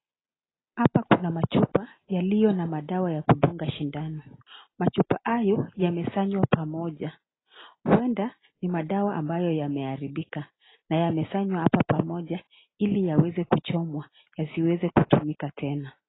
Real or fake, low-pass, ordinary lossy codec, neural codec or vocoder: real; 7.2 kHz; AAC, 16 kbps; none